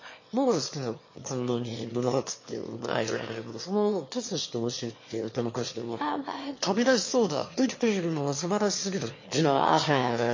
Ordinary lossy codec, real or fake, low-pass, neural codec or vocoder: MP3, 32 kbps; fake; 7.2 kHz; autoencoder, 22.05 kHz, a latent of 192 numbers a frame, VITS, trained on one speaker